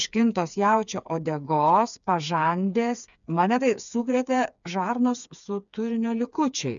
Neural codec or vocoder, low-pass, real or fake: codec, 16 kHz, 4 kbps, FreqCodec, smaller model; 7.2 kHz; fake